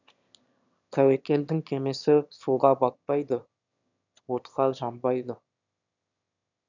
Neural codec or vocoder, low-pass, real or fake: autoencoder, 22.05 kHz, a latent of 192 numbers a frame, VITS, trained on one speaker; 7.2 kHz; fake